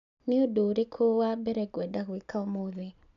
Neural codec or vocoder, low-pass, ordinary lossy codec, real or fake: none; 7.2 kHz; none; real